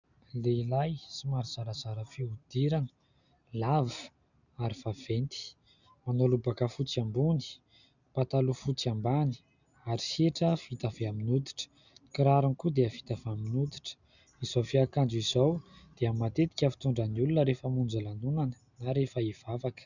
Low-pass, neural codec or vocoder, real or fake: 7.2 kHz; none; real